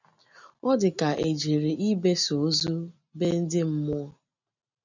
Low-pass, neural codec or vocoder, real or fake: 7.2 kHz; none; real